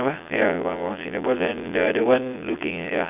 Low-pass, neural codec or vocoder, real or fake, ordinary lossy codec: 3.6 kHz; vocoder, 22.05 kHz, 80 mel bands, Vocos; fake; none